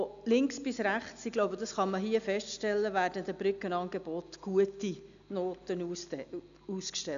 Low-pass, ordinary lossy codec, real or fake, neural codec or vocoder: 7.2 kHz; none; real; none